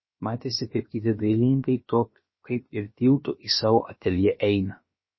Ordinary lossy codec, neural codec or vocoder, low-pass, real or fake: MP3, 24 kbps; codec, 16 kHz, about 1 kbps, DyCAST, with the encoder's durations; 7.2 kHz; fake